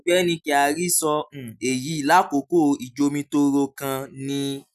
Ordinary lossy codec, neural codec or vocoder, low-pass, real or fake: none; none; 14.4 kHz; real